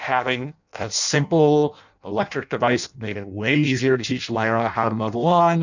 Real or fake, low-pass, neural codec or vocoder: fake; 7.2 kHz; codec, 16 kHz in and 24 kHz out, 0.6 kbps, FireRedTTS-2 codec